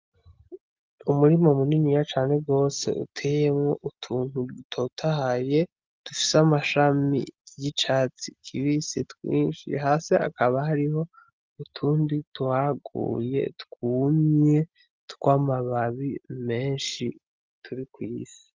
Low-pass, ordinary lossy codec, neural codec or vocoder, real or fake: 7.2 kHz; Opus, 32 kbps; none; real